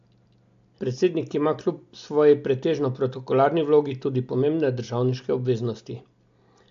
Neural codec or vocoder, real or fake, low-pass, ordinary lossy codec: none; real; 7.2 kHz; AAC, 64 kbps